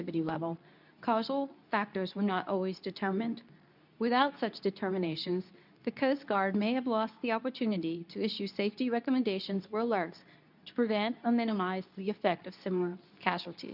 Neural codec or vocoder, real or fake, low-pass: codec, 24 kHz, 0.9 kbps, WavTokenizer, medium speech release version 2; fake; 5.4 kHz